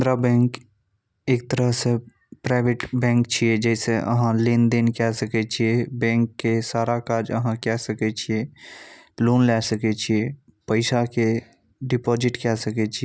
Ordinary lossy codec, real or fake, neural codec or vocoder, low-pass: none; real; none; none